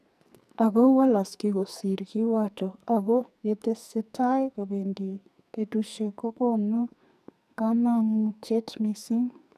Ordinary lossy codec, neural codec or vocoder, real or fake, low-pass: none; codec, 44.1 kHz, 2.6 kbps, SNAC; fake; 14.4 kHz